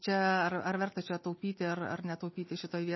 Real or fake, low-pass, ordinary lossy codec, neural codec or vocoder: real; 7.2 kHz; MP3, 24 kbps; none